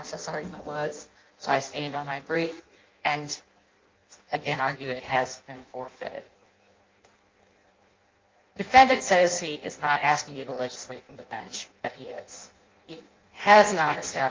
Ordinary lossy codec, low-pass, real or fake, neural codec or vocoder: Opus, 24 kbps; 7.2 kHz; fake; codec, 16 kHz in and 24 kHz out, 0.6 kbps, FireRedTTS-2 codec